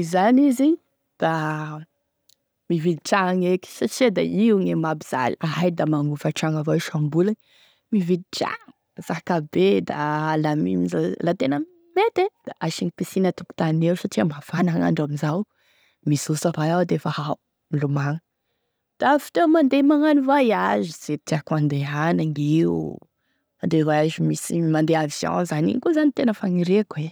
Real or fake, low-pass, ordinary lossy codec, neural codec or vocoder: real; none; none; none